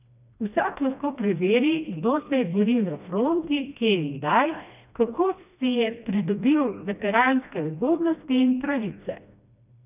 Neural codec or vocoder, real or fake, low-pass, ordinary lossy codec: codec, 16 kHz, 1 kbps, FreqCodec, smaller model; fake; 3.6 kHz; none